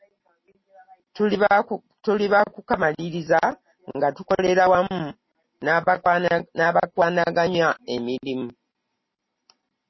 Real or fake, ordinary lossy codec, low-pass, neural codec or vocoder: real; MP3, 24 kbps; 7.2 kHz; none